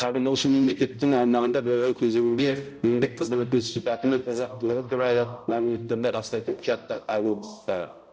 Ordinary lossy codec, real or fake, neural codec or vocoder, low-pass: none; fake; codec, 16 kHz, 0.5 kbps, X-Codec, HuBERT features, trained on balanced general audio; none